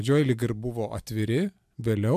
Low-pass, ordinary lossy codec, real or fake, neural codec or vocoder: 14.4 kHz; MP3, 96 kbps; real; none